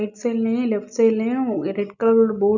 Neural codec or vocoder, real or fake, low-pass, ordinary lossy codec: none; real; 7.2 kHz; none